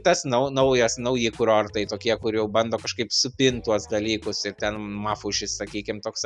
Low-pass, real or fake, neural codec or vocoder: 10.8 kHz; real; none